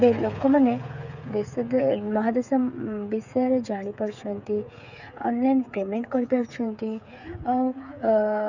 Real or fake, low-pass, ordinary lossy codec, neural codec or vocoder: fake; 7.2 kHz; none; codec, 16 kHz, 8 kbps, FreqCodec, smaller model